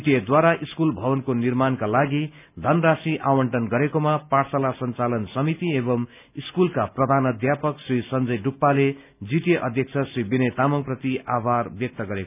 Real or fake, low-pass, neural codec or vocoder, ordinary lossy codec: real; 3.6 kHz; none; none